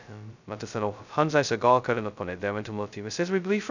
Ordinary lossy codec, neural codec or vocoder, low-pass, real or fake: none; codec, 16 kHz, 0.2 kbps, FocalCodec; 7.2 kHz; fake